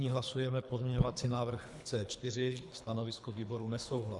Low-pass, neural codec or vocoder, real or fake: 10.8 kHz; codec, 24 kHz, 3 kbps, HILCodec; fake